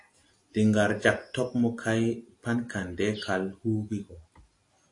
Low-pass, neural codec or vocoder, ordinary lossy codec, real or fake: 10.8 kHz; none; AAC, 48 kbps; real